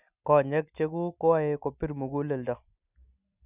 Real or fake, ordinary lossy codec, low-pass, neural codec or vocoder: real; none; 3.6 kHz; none